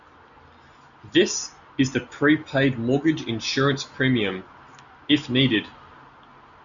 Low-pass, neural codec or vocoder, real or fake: 7.2 kHz; none; real